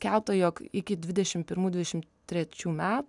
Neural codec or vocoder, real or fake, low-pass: none; real; 10.8 kHz